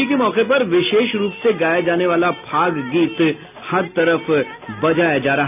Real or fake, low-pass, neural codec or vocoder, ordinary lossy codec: real; 3.6 kHz; none; none